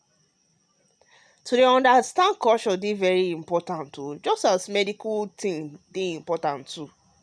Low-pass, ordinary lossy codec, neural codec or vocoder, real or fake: none; none; none; real